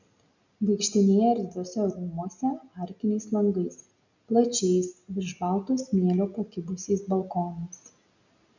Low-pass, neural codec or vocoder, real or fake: 7.2 kHz; none; real